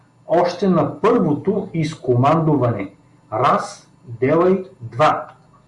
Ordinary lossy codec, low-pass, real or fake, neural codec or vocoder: Opus, 64 kbps; 10.8 kHz; real; none